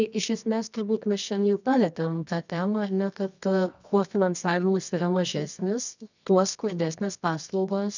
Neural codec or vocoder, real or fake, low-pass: codec, 24 kHz, 0.9 kbps, WavTokenizer, medium music audio release; fake; 7.2 kHz